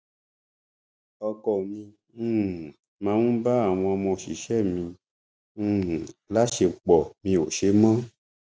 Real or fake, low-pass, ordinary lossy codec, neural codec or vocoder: real; none; none; none